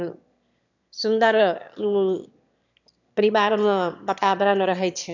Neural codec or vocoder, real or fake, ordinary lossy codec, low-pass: autoencoder, 22.05 kHz, a latent of 192 numbers a frame, VITS, trained on one speaker; fake; none; 7.2 kHz